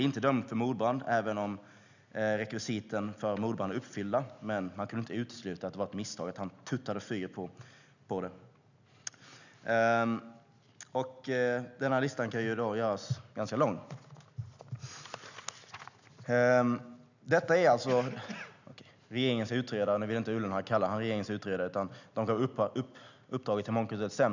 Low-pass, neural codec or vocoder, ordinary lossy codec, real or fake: 7.2 kHz; none; none; real